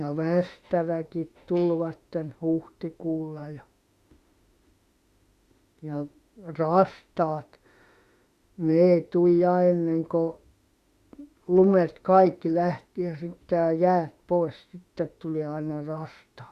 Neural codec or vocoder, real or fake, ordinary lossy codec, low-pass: autoencoder, 48 kHz, 32 numbers a frame, DAC-VAE, trained on Japanese speech; fake; Opus, 64 kbps; 14.4 kHz